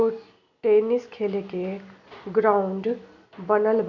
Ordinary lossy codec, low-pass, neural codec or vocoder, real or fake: none; 7.2 kHz; none; real